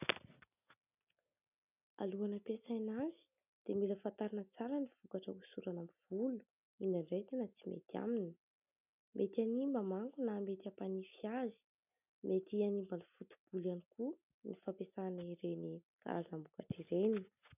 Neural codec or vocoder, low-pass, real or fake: none; 3.6 kHz; real